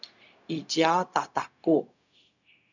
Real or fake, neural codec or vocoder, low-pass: fake; codec, 16 kHz, 0.4 kbps, LongCat-Audio-Codec; 7.2 kHz